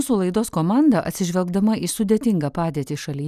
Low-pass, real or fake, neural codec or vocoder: 14.4 kHz; real; none